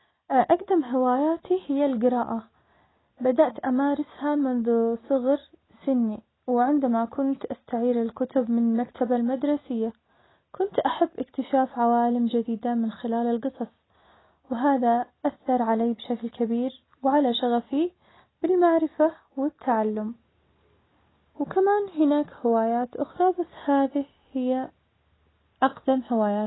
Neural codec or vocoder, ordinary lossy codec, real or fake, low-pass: none; AAC, 16 kbps; real; 7.2 kHz